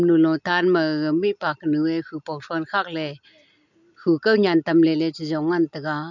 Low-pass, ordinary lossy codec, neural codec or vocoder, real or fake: 7.2 kHz; none; none; real